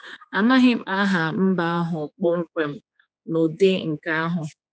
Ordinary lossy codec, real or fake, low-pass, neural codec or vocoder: none; fake; none; codec, 16 kHz, 4 kbps, X-Codec, HuBERT features, trained on general audio